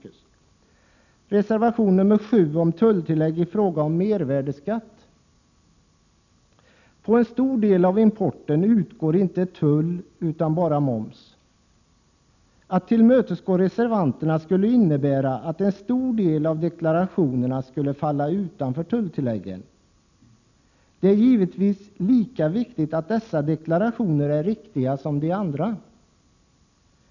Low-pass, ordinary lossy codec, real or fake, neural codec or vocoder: 7.2 kHz; none; real; none